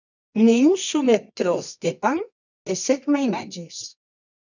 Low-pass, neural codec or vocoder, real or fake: 7.2 kHz; codec, 24 kHz, 0.9 kbps, WavTokenizer, medium music audio release; fake